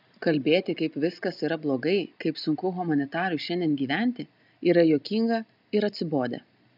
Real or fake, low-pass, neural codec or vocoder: real; 5.4 kHz; none